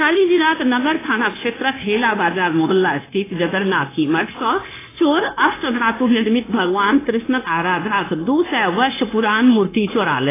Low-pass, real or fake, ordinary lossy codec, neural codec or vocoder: 3.6 kHz; fake; AAC, 16 kbps; codec, 16 kHz, 0.9 kbps, LongCat-Audio-Codec